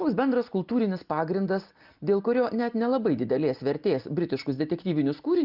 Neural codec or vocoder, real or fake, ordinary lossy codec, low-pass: none; real; Opus, 24 kbps; 5.4 kHz